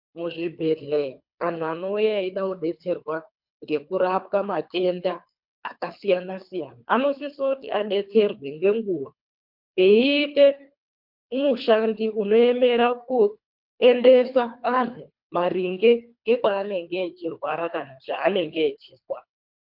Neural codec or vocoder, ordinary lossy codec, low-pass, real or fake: codec, 24 kHz, 3 kbps, HILCodec; MP3, 48 kbps; 5.4 kHz; fake